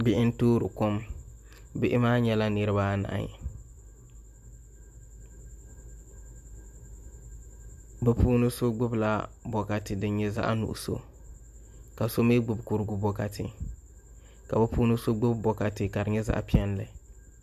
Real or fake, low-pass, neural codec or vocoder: real; 14.4 kHz; none